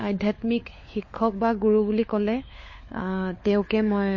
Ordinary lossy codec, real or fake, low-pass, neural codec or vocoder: MP3, 32 kbps; fake; 7.2 kHz; codec, 16 kHz, 4 kbps, FunCodec, trained on LibriTTS, 50 frames a second